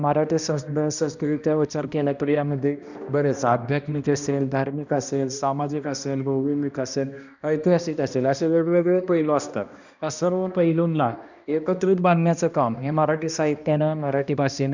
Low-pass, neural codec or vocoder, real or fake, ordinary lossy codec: 7.2 kHz; codec, 16 kHz, 1 kbps, X-Codec, HuBERT features, trained on balanced general audio; fake; none